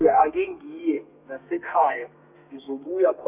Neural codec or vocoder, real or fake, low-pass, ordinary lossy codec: codec, 32 kHz, 1.9 kbps, SNAC; fake; 3.6 kHz; none